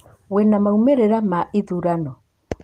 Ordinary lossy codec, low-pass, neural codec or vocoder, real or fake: Opus, 24 kbps; 14.4 kHz; none; real